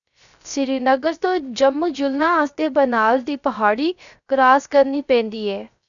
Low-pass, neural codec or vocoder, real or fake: 7.2 kHz; codec, 16 kHz, 0.3 kbps, FocalCodec; fake